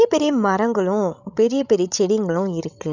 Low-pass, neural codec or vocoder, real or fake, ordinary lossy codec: 7.2 kHz; codec, 16 kHz, 16 kbps, FreqCodec, larger model; fake; none